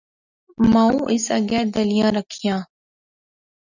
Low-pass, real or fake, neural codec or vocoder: 7.2 kHz; real; none